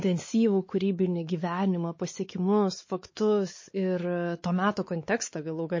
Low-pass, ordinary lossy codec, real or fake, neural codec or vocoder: 7.2 kHz; MP3, 32 kbps; fake; codec, 16 kHz, 4 kbps, X-Codec, WavLM features, trained on Multilingual LibriSpeech